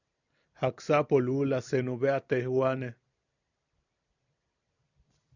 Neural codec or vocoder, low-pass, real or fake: vocoder, 44.1 kHz, 128 mel bands every 256 samples, BigVGAN v2; 7.2 kHz; fake